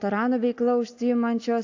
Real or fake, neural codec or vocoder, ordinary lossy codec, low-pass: real; none; AAC, 48 kbps; 7.2 kHz